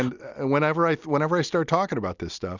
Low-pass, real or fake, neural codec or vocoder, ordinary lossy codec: 7.2 kHz; real; none; Opus, 64 kbps